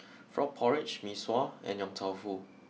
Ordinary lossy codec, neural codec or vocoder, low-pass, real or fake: none; none; none; real